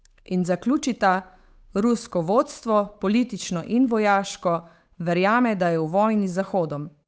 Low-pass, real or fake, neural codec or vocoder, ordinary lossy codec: none; fake; codec, 16 kHz, 8 kbps, FunCodec, trained on Chinese and English, 25 frames a second; none